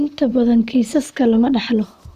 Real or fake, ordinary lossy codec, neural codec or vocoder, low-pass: fake; none; vocoder, 44.1 kHz, 128 mel bands, Pupu-Vocoder; 14.4 kHz